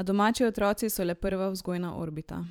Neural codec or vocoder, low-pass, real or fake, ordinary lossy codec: vocoder, 44.1 kHz, 128 mel bands every 512 samples, BigVGAN v2; none; fake; none